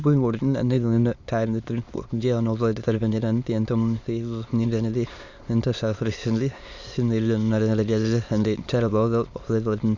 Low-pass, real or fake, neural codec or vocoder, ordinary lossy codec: 7.2 kHz; fake; autoencoder, 22.05 kHz, a latent of 192 numbers a frame, VITS, trained on many speakers; Opus, 64 kbps